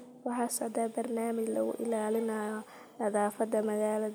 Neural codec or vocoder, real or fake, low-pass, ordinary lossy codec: none; real; none; none